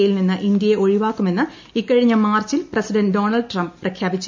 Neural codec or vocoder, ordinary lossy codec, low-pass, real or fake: none; MP3, 64 kbps; 7.2 kHz; real